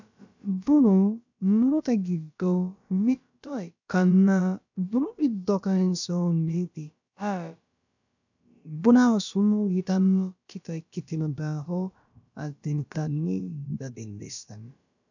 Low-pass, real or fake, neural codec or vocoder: 7.2 kHz; fake; codec, 16 kHz, about 1 kbps, DyCAST, with the encoder's durations